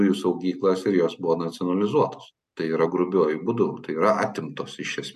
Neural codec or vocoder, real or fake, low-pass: none; real; 14.4 kHz